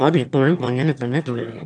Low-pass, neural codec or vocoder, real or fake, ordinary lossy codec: 9.9 kHz; autoencoder, 22.05 kHz, a latent of 192 numbers a frame, VITS, trained on one speaker; fake; none